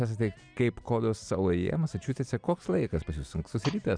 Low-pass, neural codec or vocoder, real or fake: 9.9 kHz; none; real